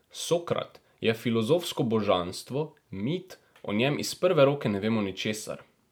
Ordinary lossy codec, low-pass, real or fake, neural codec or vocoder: none; none; real; none